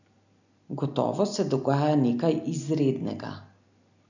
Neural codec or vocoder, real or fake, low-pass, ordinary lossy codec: none; real; 7.2 kHz; none